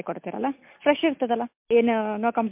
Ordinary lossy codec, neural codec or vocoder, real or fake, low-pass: MP3, 32 kbps; none; real; 3.6 kHz